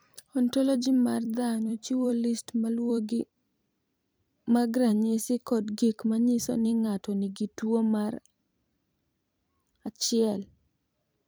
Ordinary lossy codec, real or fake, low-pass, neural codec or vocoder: none; fake; none; vocoder, 44.1 kHz, 128 mel bands every 256 samples, BigVGAN v2